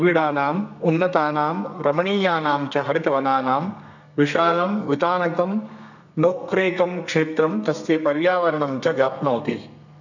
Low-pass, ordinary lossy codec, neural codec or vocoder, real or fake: 7.2 kHz; none; codec, 32 kHz, 1.9 kbps, SNAC; fake